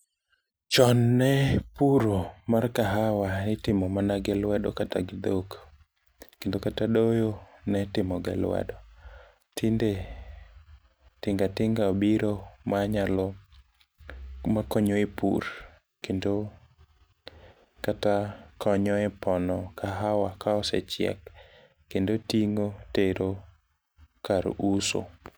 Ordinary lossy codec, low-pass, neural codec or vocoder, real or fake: none; none; none; real